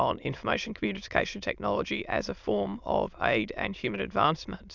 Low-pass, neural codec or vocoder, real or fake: 7.2 kHz; autoencoder, 22.05 kHz, a latent of 192 numbers a frame, VITS, trained on many speakers; fake